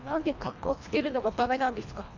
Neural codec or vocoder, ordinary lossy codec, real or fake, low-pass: codec, 24 kHz, 1.5 kbps, HILCodec; AAC, 48 kbps; fake; 7.2 kHz